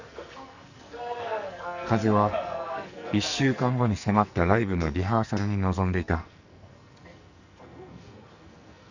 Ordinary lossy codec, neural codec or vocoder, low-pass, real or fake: none; codec, 44.1 kHz, 2.6 kbps, SNAC; 7.2 kHz; fake